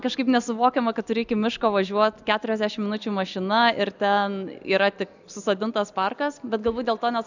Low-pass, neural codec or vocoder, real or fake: 7.2 kHz; none; real